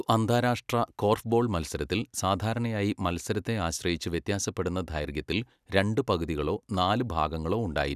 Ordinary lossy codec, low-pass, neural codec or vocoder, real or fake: none; 14.4 kHz; vocoder, 44.1 kHz, 128 mel bands every 256 samples, BigVGAN v2; fake